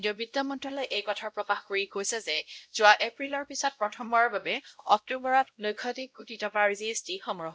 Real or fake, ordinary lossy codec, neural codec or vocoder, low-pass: fake; none; codec, 16 kHz, 0.5 kbps, X-Codec, WavLM features, trained on Multilingual LibriSpeech; none